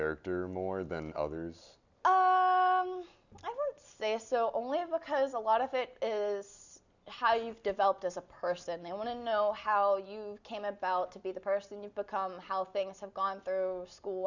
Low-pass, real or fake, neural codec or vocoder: 7.2 kHz; real; none